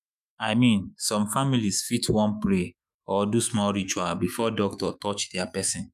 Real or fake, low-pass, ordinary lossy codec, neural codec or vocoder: fake; 14.4 kHz; none; autoencoder, 48 kHz, 128 numbers a frame, DAC-VAE, trained on Japanese speech